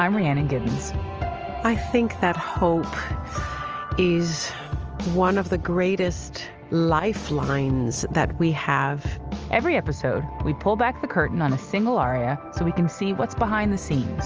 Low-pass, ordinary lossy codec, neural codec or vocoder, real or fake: 7.2 kHz; Opus, 24 kbps; none; real